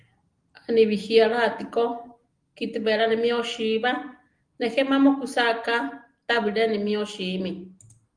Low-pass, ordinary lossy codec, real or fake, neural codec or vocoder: 9.9 kHz; Opus, 24 kbps; real; none